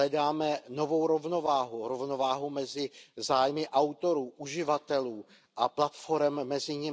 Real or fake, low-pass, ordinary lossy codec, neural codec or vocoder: real; none; none; none